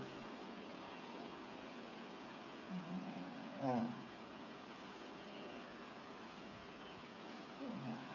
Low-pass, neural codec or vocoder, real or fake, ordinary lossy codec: 7.2 kHz; codec, 16 kHz, 8 kbps, FreqCodec, smaller model; fake; none